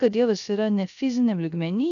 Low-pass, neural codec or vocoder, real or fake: 7.2 kHz; codec, 16 kHz, 0.3 kbps, FocalCodec; fake